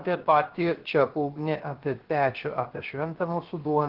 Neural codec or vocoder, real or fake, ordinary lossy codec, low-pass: codec, 16 kHz, 0.7 kbps, FocalCodec; fake; Opus, 16 kbps; 5.4 kHz